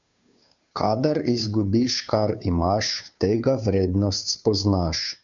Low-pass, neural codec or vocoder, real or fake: 7.2 kHz; codec, 16 kHz, 2 kbps, FunCodec, trained on Chinese and English, 25 frames a second; fake